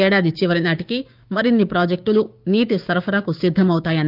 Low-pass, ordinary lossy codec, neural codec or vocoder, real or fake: 5.4 kHz; Opus, 24 kbps; codec, 16 kHz, 6 kbps, DAC; fake